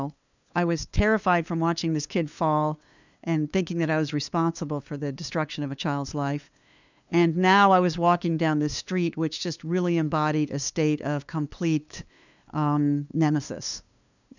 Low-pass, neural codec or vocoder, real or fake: 7.2 kHz; codec, 16 kHz, 2 kbps, FunCodec, trained on LibriTTS, 25 frames a second; fake